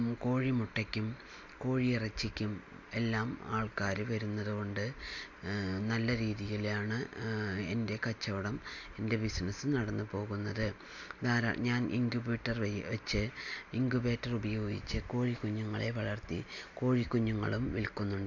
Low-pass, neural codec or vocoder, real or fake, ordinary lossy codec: 7.2 kHz; none; real; AAC, 48 kbps